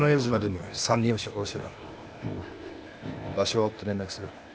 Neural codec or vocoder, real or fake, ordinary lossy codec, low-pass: codec, 16 kHz, 0.8 kbps, ZipCodec; fake; none; none